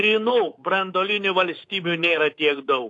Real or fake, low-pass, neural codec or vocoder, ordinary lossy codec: fake; 10.8 kHz; codec, 24 kHz, 3.1 kbps, DualCodec; AAC, 48 kbps